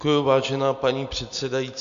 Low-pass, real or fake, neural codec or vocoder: 7.2 kHz; real; none